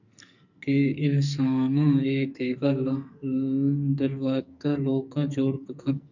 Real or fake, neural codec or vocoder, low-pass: fake; codec, 44.1 kHz, 2.6 kbps, SNAC; 7.2 kHz